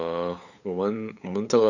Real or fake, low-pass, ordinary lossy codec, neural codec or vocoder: fake; 7.2 kHz; none; codec, 16 kHz, 8 kbps, FunCodec, trained on LibriTTS, 25 frames a second